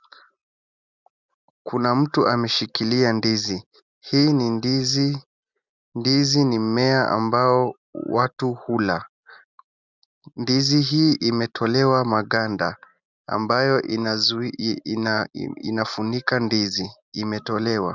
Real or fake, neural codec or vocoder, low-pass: real; none; 7.2 kHz